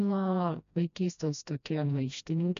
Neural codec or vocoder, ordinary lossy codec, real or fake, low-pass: codec, 16 kHz, 1 kbps, FreqCodec, smaller model; MP3, 64 kbps; fake; 7.2 kHz